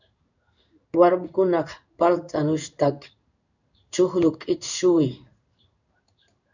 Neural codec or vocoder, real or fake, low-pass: codec, 16 kHz in and 24 kHz out, 1 kbps, XY-Tokenizer; fake; 7.2 kHz